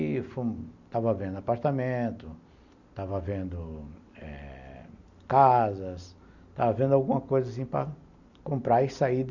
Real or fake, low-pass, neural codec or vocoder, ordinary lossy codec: real; 7.2 kHz; none; none